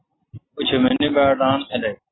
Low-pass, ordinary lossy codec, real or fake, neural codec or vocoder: 7.2 kHz; AAC, 16 kbps; real; none